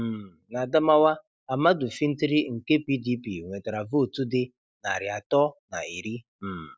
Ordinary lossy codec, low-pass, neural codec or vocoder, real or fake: none; none; none; real